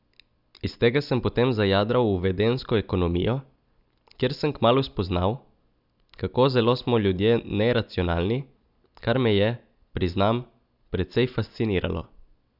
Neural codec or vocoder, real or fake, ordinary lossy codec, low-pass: none; real; none; 5.4 kHz